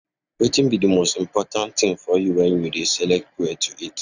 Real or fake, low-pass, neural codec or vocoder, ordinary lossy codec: real; 7.2 kHz; none; none